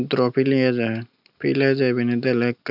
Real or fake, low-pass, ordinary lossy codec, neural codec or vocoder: real; 5.4 kHz; none; none